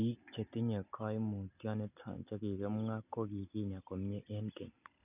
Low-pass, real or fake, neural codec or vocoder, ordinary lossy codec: 3.6 kHz; real; none; none